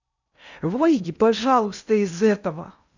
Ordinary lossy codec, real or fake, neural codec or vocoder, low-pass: none; fake; codec, 16 kHz in and 24 kHz out, 0.6 kbps, FocalCodec, streaming, 4096 codes; 7.2 kHz